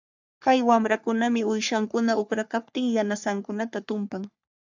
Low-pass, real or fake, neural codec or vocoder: 7.2 kHz; fake; codec, 44.1 kHz, 3.4 kbps, Pupu-Codec